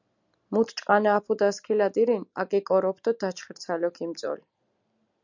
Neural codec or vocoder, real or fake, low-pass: none; real; 7.2 kHz